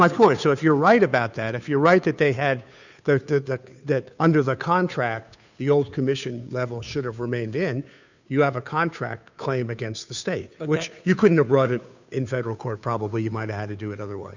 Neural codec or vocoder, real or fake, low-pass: codec, 16 kHz, 2 kbps, FunCodec, trained on Chinese and English, 25 frames a second; fake; 7.2 kHz